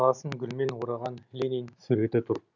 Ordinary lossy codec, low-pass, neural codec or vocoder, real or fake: none; 7.2 kHz; none; real